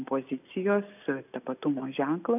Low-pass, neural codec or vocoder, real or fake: 3.6 kHz; none; real